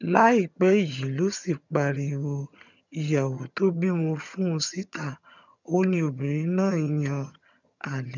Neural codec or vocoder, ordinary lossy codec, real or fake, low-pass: vocoder, 22.05 kHz, 80 mel bands, HiFi-GAN; none; fake; 7.2 kHz